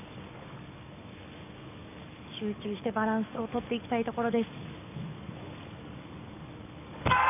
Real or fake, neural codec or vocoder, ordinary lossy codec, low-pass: fake; codec, 44.1 kHz, 7.8 kbps, DAC; none; 3.6 kHz